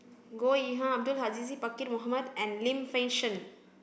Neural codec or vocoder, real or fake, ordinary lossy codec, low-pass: none; real; none; none